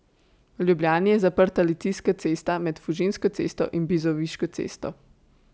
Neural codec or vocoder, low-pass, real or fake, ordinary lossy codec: none; none; real; none